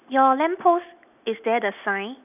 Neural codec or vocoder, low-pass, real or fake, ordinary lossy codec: none; 3.6 kHz; real; none